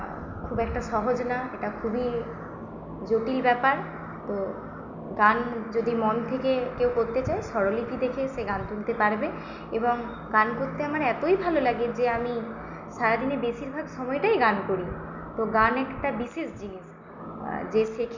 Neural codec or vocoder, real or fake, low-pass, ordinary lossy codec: none; real; 7.2 kHz; none